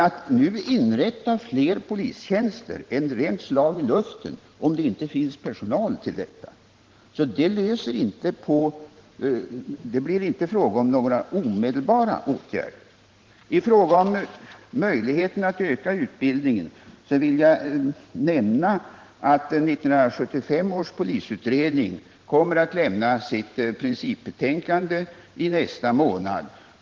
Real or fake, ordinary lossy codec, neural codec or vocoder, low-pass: fake; Opus, 16 kbps; vocoder, 44.1 kHz, 128 mel bands every 512 samples, BigVGAN v2; 7.2 kHz